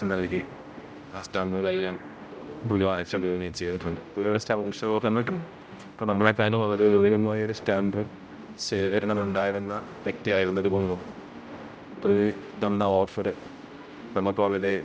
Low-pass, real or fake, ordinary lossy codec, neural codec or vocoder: none; fake; none; codec, 16 kHz, 0.5 kbps, X-Codec, HuBERT features, trained on general audio